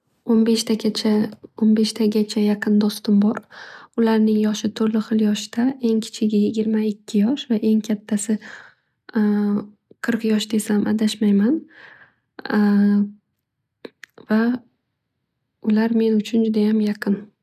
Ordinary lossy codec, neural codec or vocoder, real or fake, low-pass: none; none; real; 14.4 kHz